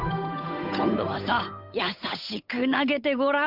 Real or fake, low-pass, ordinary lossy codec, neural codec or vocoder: fake; 5.4 kHz; none; codec, 16 kHz, 8 kbps, FunCodec, trained on Chinese and English, 25 frames a second